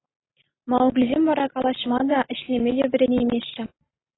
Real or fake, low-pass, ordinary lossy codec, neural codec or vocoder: real; 7.2 kHz; AAC, 16 kbps; none